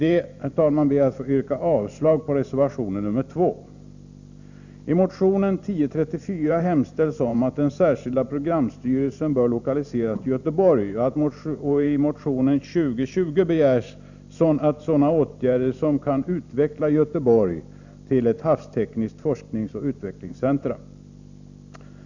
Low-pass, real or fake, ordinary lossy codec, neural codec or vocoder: 7.2 kHz; fake; none; vocoder, 44.1 kHz, 128 mel bands every 512 samples, BigVGAN v2